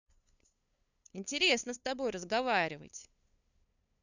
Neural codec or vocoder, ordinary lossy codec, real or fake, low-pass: codec, 16 kHz, 2 kbps, FunCodec, trained on LibriTTS, 25 frames a second; none; fake; 7.2 kHz